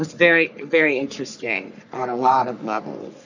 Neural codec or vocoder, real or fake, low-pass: codec, 44.1 kHz, 3.4 kbps, Pupu-Codec; fake; 7.2 kHz